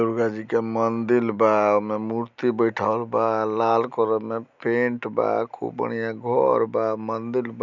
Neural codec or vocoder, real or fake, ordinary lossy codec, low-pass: none; real; none; 7.2 kHz